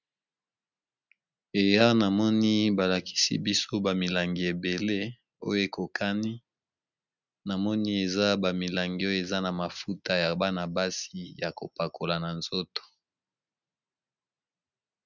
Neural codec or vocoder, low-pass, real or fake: none; 7.2 kHz; real